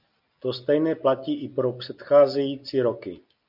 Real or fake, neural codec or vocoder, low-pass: real; none; 5.4 kHz